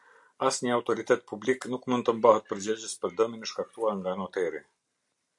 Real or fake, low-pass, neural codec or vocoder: real; 10.8 kHz; none